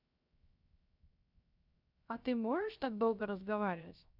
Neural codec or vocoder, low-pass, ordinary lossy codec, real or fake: codec, 16 kHz, 0.7 kbps, FocalCodec; 5.4 kHz; none; fake